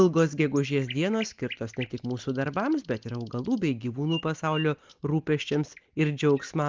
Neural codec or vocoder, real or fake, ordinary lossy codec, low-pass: none; real; Opus, 32 kbps; 7.2 kHz